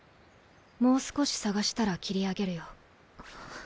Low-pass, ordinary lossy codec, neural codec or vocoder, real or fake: none; none; none; real